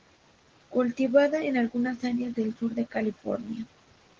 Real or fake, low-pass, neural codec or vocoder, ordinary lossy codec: real; 7.2 kHz; none; Opus, 16 kbps